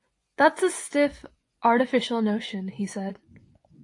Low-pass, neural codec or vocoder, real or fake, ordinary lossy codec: 10.8 kHz; vocoder, 24 kHz, 100 mel bands, Vocos; fake; AAC, 48 kbps